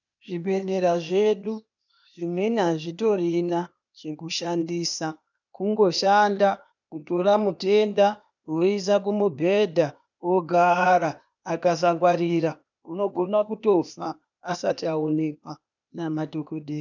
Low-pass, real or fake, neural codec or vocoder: 7.2 kHz; fake; codec, 16 kHz, 0.8 kbps, ZipCodec